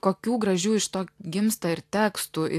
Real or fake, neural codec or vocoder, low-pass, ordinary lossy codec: real; none; 14.4 kHz; AAC, 64 kbps